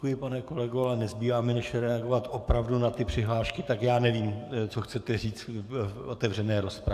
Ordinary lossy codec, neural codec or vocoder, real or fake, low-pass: AAC, 96 kbps; codec, 44.1 kHz, 7.8 kbps, DAC; fake; 14.4 kHz